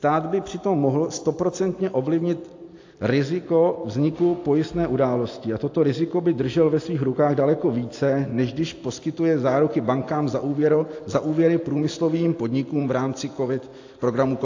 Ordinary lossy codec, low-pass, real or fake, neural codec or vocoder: AAC, 48 kbps; 7.2 kHz; real; none